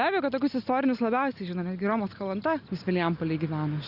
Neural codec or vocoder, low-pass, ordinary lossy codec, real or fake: none; 5.4 kHz; Opus, 64 kbps; real